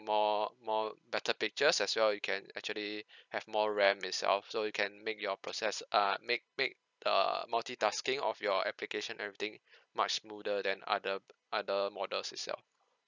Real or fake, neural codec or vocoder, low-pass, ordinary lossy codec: real; none; 7.2 kHz; none